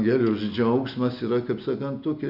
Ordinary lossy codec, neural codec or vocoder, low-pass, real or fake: AAC, 48 kbps; none; 5.4 kHz; real